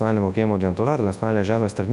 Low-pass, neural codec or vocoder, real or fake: 10.8 kHz; codec, 24 kHz, 0.9 kbps, WavTokenizer, large speech release; fake